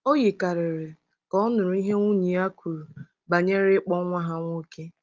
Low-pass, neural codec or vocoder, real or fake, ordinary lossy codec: 7.2 kHz; none; real; Opus, 32 kbps